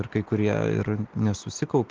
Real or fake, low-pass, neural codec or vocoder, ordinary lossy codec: real; 7.2 kHz; none; Opus, 16 kbps